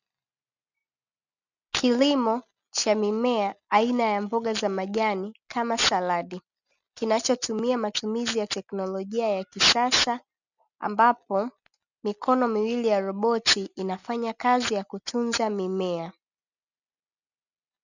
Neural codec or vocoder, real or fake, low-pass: none; real; 7.2 kHz